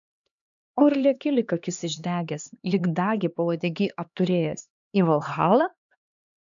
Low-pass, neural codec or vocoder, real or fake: 7.2 kHz; codec, 16 kHz, 2 kbps, X-Codec, HuBERT features, trained on LibriSpeech; fake